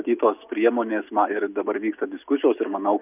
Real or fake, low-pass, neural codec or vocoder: real; 3.6 kHz; none